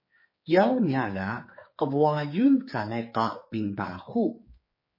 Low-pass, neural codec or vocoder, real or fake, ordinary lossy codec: 5.4 kHz; codec, 16 kHz, 4 kbps, X-Codec, HuBERT features, trained on general audio; fake; MP3, 24 kbps